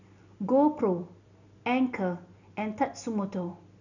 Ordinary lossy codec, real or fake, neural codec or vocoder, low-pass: none; real; none; 7.2 kHz